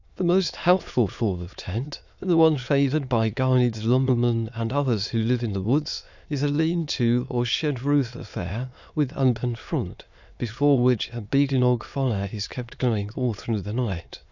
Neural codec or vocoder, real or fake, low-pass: autoencoder, 22.05 kHz, a latent of 192 numbers a frame, VITS, trained on many speakers; fake; 7.2 kHz